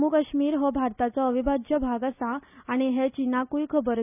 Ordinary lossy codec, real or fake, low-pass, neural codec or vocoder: none; real; 3.6 kHz; none